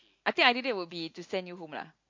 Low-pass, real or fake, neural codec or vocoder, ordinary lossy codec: 7.2 kHz; real; none; MP3, 48 kbps